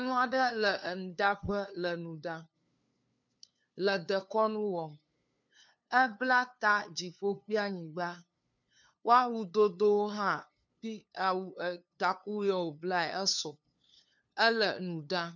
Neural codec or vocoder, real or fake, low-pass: codec, 16 kHz, 2 kbps, FunCodec, trained on LibriTTS, 25 frames a second; fake; 7.2 kHz